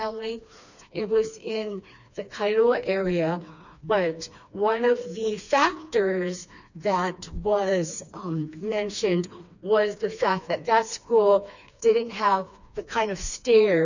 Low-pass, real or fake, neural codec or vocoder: 7.2 kHz; fake; codec, 16 kHz, 2 kbps, FreqCodec, smaller model